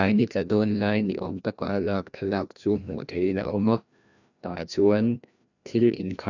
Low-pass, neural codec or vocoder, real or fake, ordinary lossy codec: 7.2 kHz; codec, 16 kHz, 1 kbps, FreqCodec, larger model; fake; none